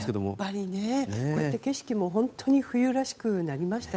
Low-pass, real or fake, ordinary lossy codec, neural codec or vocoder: none; fake; none; codec, 16 kHz, 8 kbps, FunCodec, trained on Chinese and English, 25 frames a second